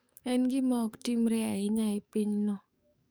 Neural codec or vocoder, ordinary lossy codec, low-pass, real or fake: codec, 44.1 kHz, 7.8 kbps, DAC; none; none; fake